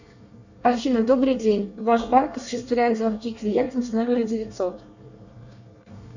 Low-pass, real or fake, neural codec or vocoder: 7.2 kHz; fake; codec, 24 kHz, 1 kbps, SNAC